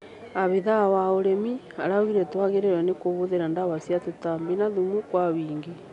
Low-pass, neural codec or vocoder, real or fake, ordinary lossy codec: 10.8 kHz; none; real; none